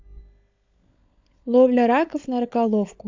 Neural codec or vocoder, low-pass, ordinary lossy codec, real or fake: codec, 16 kHz, 16 kbps, FunCodec, trained on LibriTTS, 50 frames a second; 7.2 kHz; AAC, 48 kbps; fake